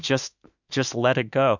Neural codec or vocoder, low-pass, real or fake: autoencoder, 48 kHz, 32 numbers a frame, DAC-VAE, trained on Japanese speech; 7.2 kHz; fake